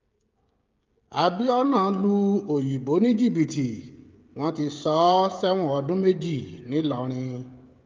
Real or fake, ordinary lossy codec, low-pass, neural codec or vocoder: fake; Opus, 32 kbps; 7.2 kHz; codec, 16 kHz, 16 kbps, FreqCodec, smaller model